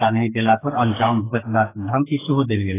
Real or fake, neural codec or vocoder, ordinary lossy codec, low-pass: fake; codec, 44.1 kHz, 2.6 kbps, SNAC; AAC, 16 kbps; 3.6 kHz